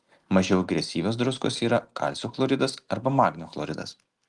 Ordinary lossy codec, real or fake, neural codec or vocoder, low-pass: Opus, 24 kbps; real; none; 10.8 kHz